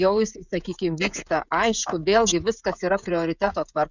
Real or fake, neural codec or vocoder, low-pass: fake; codec, 16 kHz, 16 kbps, FreqCodec, smaller model; 7.2 kHz